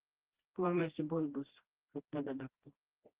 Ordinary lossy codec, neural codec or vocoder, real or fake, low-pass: Opus, 16 kbps; codec, 16 kHz, 1 kbps, FreqCodec, smaller model; fake; 3.6 kHz